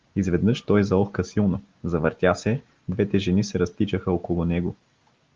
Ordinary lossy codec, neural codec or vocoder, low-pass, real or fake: Opus, 32 kbps; none; 7.2 kHz; real